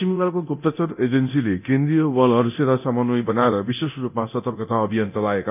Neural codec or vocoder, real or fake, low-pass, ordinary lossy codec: codec, 24 kHz, 0.9 kbps, DualCodec; fake; 3.6 kHz; none